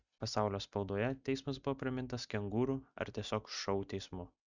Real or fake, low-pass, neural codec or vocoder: real; 7.2 kHz; none